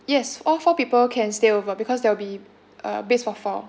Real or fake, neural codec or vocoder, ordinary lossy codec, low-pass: real; none; none; none